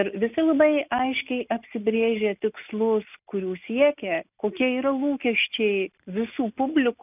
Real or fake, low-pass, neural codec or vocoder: real; 3.6 kHz; none